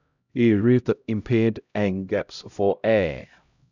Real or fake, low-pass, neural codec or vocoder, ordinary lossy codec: fake; 7.2 kHz; codec, 16 kHz, 0.5 kbps, X-Codec, HuBERT features, trained on LibriSpeech; none